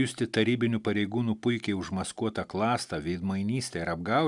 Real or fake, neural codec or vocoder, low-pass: real; none; 10.8 kHz